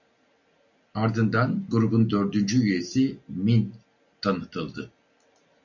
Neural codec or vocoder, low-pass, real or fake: none; 7.2 kHz; real